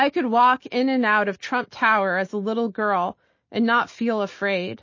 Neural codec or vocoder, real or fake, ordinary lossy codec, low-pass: none; real; MP3, 32 kbps; 7.2 kHz